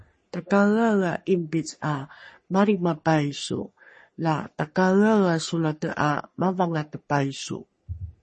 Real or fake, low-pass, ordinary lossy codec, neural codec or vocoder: fake; 10.8 kHz; MP3, 32 kbps; codec, 44.1 kHz, 3.4 kbps, Pupu-Codec